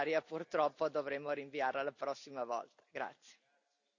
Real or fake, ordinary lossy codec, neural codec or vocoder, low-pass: real; none; none; 7.2 kHz